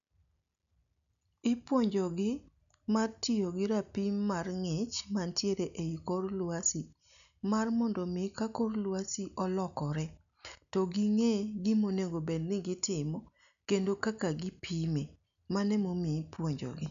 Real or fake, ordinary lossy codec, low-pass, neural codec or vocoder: real; none; 7.2 kHz; none